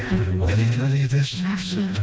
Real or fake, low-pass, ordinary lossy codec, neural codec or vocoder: fake; none; none; codec, 16 kHz, 1 kbps, FreqCodec, smaller model